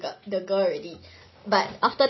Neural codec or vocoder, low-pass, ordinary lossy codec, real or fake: none; 7.2 kHz; MP3, 24 kbps; real